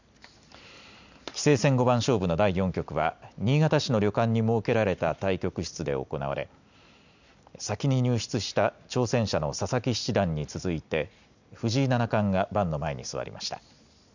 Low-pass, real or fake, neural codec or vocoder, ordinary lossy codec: 7.2 kHz; real; none; none